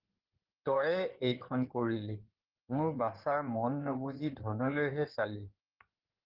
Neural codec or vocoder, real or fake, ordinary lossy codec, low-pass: codec, 16 kHz in and 24 kHz out, 2.2 kbps, FireRedTTS-2 codec; fake; Opus, 16 kbps; 5.4 kHz